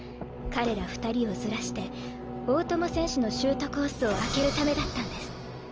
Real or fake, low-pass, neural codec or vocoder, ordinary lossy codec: real; 7.2 kHz; none; Opus, 24 kbps